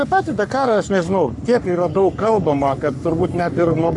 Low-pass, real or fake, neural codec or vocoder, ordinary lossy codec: 10.8 kHz; fake; codec, 44.1 kHz, 3.4 kbps, Pupu-Codec; MP3, 64 kbps